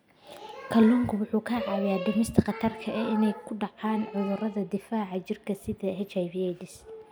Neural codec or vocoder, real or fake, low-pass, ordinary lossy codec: none; real; none; none